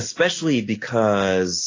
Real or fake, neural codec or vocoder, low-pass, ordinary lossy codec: real; none; 7.2 kHz; AAC, 32 kbps